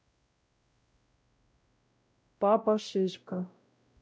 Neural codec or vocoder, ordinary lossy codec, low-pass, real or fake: codec, 16 kHz, 0.5 kbps, X-Codec, WavLM features, trained on Multilingual LibriSpeech; none; none; fake